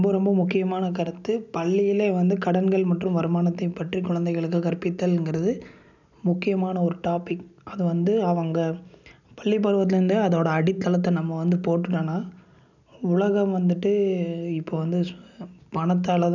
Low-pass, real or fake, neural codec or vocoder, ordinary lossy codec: 7.2 kHz; real; none; none